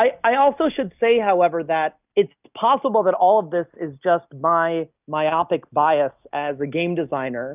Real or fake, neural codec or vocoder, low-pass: real; none; 3.6 kHz